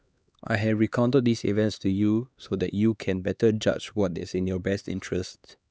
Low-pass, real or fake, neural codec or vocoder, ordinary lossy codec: none; fake; codec, 16 kHz, 2 kbps, X-Codec, HuBERT features, trained on LibriSpeech; none